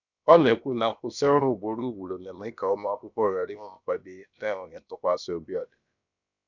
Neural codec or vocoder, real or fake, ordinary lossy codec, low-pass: codec, 16 kHz, about 1 kbps, DyCAST, with the encoder's durations; fake; none; 7.2 kHz